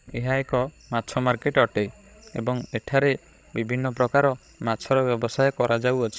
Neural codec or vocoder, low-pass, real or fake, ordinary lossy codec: codec, 16 kHz, 16 kbps, FreqCodec, larger model; none; fake; none